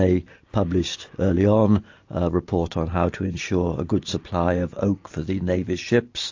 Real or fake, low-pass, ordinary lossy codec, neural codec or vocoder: real; 7.2 kHz; AAC, 48 kbps; none